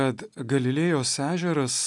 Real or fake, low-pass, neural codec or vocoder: real; 10.8 kHz; none